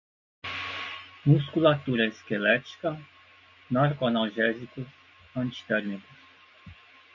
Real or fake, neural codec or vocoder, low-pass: real; none; 7.2 kHz